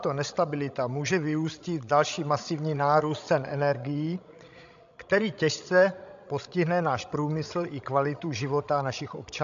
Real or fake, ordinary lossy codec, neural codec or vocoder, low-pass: fake; AAC, 64 kbps; codec, 16 kHz, 16 kbps, FreqCodec, larger model; 7.2 kHz